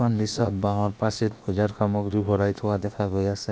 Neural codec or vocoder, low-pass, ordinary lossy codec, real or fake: codec, 16 kHz, about 1 kbps, DyCAST, with the encoder's durations; none; none; fake